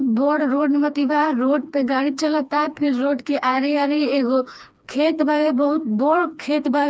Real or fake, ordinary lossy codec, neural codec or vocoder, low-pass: fake; none; codec, 16 kHz, 2 kbps, FreqCodec, smaller model; none